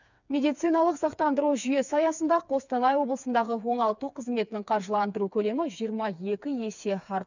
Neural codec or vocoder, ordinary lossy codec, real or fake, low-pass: codec, 16 kHz, 4 kbps, FreqCodec, smaller model; none; fake; 7.2 kHz